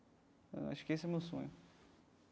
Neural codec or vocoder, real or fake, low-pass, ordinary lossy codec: none; real; none; none